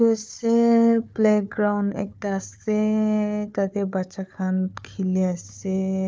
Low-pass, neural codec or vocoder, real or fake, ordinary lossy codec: none; codec, 16 kHz, 4 kbps, FunCodec, trained on Chinese and English, 50 frames a second; fake; none